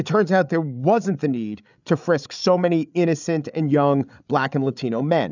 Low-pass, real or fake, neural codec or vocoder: 7.2 kHz; fake; codec, 16 kHz, 8 kbps, FreqCodec, larger model